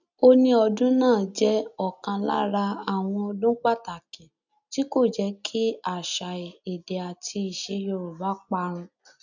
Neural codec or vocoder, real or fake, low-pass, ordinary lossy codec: none; real; 7.2 kHz; none